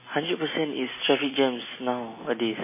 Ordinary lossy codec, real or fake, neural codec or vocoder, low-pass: MP3, 16 kbps; real; none; 3.6 kHz